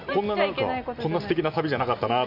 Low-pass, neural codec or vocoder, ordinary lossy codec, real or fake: 5.4 kHz; none; MP3, 48 kbps; real